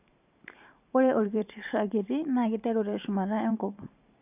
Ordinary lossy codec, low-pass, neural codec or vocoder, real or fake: none; 3.6 kHz; vocoder, 44.1 kHz, 128 mel bands every 512 samples, BigVGAN v2; fake